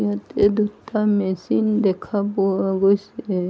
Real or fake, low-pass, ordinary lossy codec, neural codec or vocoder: real; none; none; none